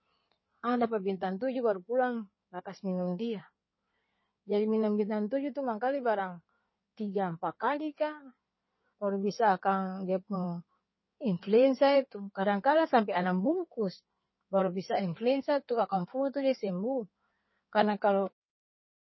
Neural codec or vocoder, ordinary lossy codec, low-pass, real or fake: codec, 16 kHz in and 24 kHz out, 2.2 kbps, FireRedTTS-2 codec; MP3, 24 kbps; 7.2 kHz; fake